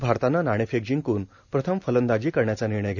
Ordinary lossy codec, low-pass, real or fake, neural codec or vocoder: none; 7.2 kHz; real; none